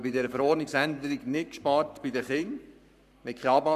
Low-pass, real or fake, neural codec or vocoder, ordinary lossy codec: 14.4 kHz; fake; codec, 44.1 kHz, 7.8 kbps, Pupu-Codec; none